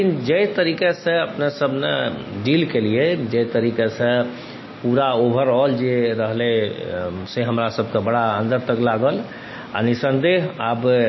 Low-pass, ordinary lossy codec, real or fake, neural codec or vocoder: 7.2 kHz; MP3, 24 kbps; real; none